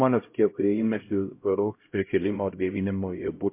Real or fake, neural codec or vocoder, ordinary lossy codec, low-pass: fake; codec, 16 kHz, 0.5 kbps, X-Codec, HuBERT features, trained on LibriSpeech; MP3, 24 kbps; 3.6 kHz